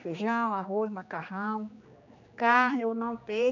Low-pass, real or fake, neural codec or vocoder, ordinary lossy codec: 7.2 kHz; fake; codec, 16 kHz, 2 kbps, X-Codec, HuBERT features, trained on general audio; none